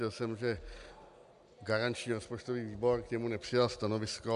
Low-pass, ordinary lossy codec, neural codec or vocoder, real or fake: 10.8 kHz; AAC, 96 kbps; none; real